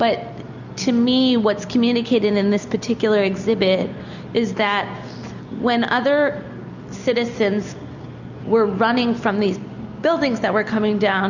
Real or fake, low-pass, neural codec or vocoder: real; 7.2 kHz; none